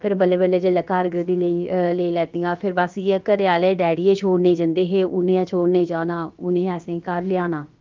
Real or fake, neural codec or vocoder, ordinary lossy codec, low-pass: fake; codec, 16 kHz, about 1 kbps, DyCAST, with the encoder's durations; Opus, 32 kbps; 7.2 kHz